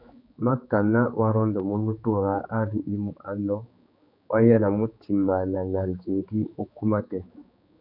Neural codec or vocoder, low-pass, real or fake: codec, 16 kHz, 4 kbps, X-Codec, HuBERT features, trained on general audio; 5.4 kHz; fake